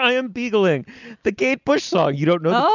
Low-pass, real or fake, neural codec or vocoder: 7.2 kHz; real; none